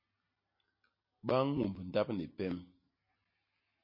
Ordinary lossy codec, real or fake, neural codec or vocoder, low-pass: MP3, 24 kbps; real; none; 5.4 kHz